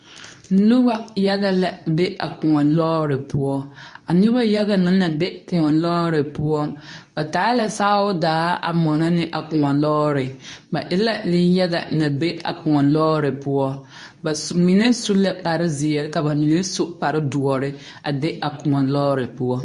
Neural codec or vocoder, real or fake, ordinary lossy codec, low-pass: codec, 24 kHz, 0.9 kbps, WavTokenizer, medium speech release version 2; fake; MP3, 48 kbps; 10.8 kHz